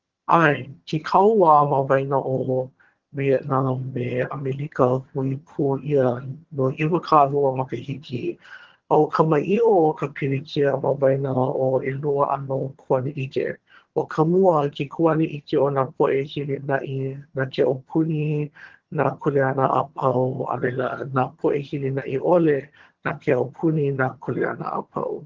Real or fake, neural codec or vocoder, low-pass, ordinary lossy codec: fake; vocoder, 22.05 kHz, 80 mel bands, HiFi-GAN; 7.2 kHz; Opus, 16 kbps